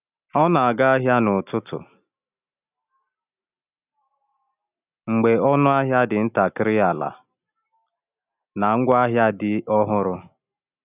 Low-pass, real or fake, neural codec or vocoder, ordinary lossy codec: 3.6 kHz; real; none; none